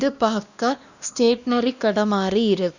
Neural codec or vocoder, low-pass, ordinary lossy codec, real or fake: codec, 16 kHz, 1 kbps, X-Codec, WavLM features, trained on Multilingual LibriSpeech; 7.2 kHz; none; fake